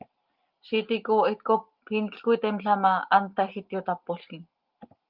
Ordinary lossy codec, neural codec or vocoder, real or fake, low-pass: Opus, 32 kbps; none; real; 5.4 kHz